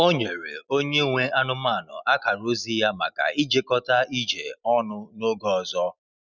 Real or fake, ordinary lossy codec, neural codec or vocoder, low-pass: real; none; none; 7.2 kHz